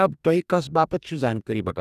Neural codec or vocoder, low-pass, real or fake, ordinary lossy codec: codec, 44.1 kHz, 2.6 kbps, DAC; 14.4 kHz; fake; none